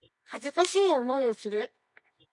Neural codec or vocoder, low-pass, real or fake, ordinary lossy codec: codec, 24 kHz, 0.9 kbps, WavTokenizer, medium music audio release; 10.8 kHz; fake; MP3, 64 kbps